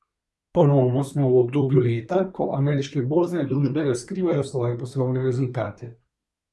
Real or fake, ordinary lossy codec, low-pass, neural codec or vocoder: fake; none; none; codec, 24 kHz, 1 kbps, SNAC